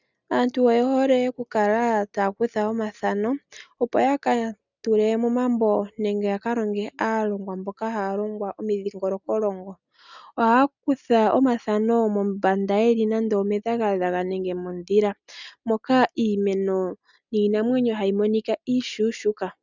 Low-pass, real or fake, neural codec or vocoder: 7.2 kHz; real; none